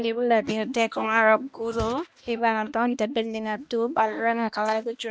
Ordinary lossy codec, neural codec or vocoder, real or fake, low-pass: none; codec, 16 kHz, 1 kbps, X-Codec, HuBERT features, trained on balanced general audio; fake; none